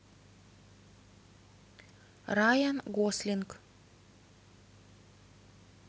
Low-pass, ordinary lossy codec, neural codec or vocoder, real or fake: none; none; none; real